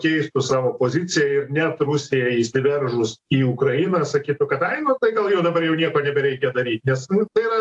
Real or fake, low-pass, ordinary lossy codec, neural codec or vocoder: real; 10.8 kHz; AAC, 64 kbps; none